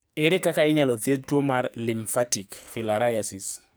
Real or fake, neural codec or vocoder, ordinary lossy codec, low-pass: fake; codec, 44.1 kHz, 2.6 kbps, SNAC; none; none